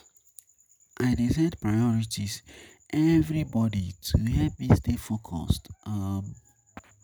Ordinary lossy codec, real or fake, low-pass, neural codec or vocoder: none; real; none; none